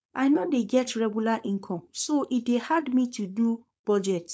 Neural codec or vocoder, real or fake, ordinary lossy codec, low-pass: codec, 16 kHz, 4.8 kbps, FACodec; fake; none; none